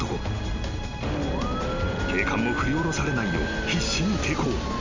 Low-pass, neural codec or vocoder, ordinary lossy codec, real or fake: 7.2 kHz; none; MP3, 64 kbps; real